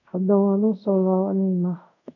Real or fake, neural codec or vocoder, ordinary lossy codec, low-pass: fake; codec, 24 kHz, 0.5 kbps, DualCodec; none; 7.2 kHz